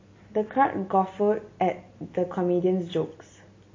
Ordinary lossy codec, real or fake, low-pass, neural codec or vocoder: MP3, 32 kbps; real; 7.2 kHz; none